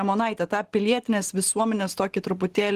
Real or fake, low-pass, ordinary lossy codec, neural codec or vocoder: real; 14.4 kHz; Opus, 16 kbps; none